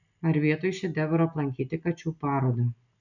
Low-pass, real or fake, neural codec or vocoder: 7.2 kHz; real; none